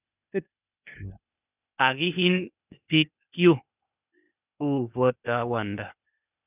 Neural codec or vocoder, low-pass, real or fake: codec, 16 kHz, 0.8 kbps, ZipCodec; 3.6 kHz; fake